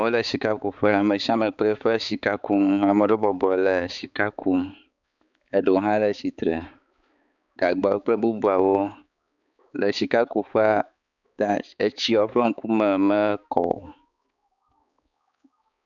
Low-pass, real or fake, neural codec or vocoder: 7.2 kHz; fake; codec, 16 kHz, 4 kbps, X-Codec, HuBERT features, trained on balanced general audio